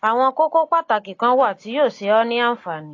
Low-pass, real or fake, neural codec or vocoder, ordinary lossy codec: 7.2 kHz; real; none; AAC, 32 kbps